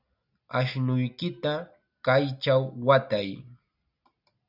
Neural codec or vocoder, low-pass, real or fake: none; 5.4 kHz; real